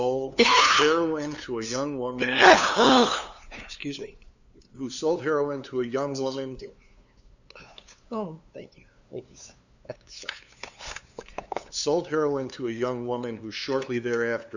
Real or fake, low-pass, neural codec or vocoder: fake; 7.2 kHz; codec, 16 kHz, 2 kbps, FunCodec, trained on LibriTTS, 25 frames a second